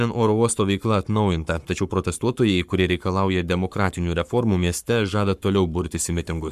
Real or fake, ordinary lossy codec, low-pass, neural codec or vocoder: fake; MP3, 96 kbps; 14.4 kHz; codec, 44.1 kHz, 7.8 kbps, Pupu-Codec